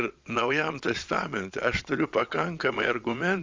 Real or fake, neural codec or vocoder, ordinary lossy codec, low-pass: fake; vocoder, 22.05 kHz, 80 mel bands, Vocos; Opus, 32 kbps; 7.2 kHz